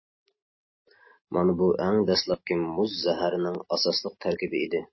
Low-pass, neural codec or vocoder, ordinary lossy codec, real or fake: 7.2 kHz; none; MP3, 24 kbps; real